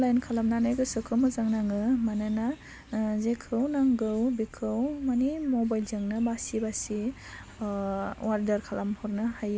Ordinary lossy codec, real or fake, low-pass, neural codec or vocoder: none; real; none; none